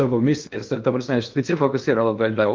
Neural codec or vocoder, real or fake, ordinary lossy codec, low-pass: codec, 16 kHz in and 24 kHz out, 0.8 kbps, FocalCodec, streaming, 65536 codes; fake; Opus, 24 kbps; 7.2 kHz